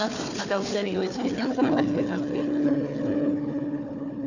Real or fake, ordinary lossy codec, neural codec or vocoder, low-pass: fake; none; codec, 16 kHz, 4 kbps, FunCodec, trained on Chinese and English, 50 frames a second; 7.2 kHz